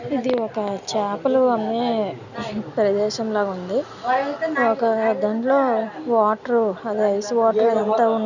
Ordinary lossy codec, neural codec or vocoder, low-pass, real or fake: none; none; 7.2 kHz; real